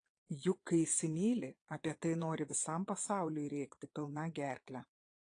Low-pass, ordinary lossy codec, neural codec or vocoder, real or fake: 9.9 kHz; AAC, 48 kbps; vocoder, 22.05 kHz, 80 mel bands, Vocos; fake